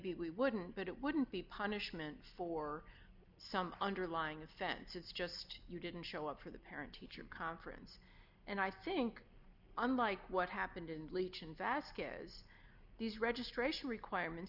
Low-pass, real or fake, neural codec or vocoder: 5.4 kHz; real; none